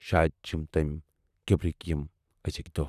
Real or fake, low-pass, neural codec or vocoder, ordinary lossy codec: fake; 14.4 kHz; vocoder, 44.1 kHz, 128 mel bands, Pupu-Vocoder; none